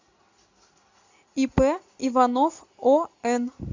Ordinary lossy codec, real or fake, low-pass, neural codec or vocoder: AAC, 48 kbps; real; 7.2 kHz; none